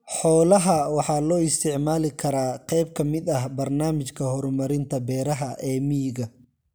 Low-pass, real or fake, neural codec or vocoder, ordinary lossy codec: none; real; none; none